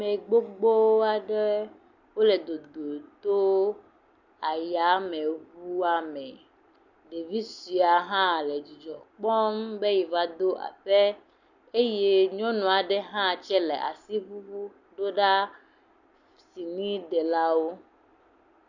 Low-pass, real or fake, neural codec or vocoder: 7.2 kHz; real; none